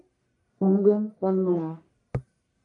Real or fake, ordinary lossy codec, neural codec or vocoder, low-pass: fake; AAC, 64 kbps; codec, 44.1 kHz, 1.7 kbps, Pupu-Codec; 10.8 kHz